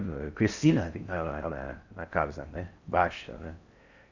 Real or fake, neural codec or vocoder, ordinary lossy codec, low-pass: fake; codec, 16 kHz in and 24 kHz out, 0.6 kbps, FocalCodec, streaming, 4096 codes; none; 7.2 kHz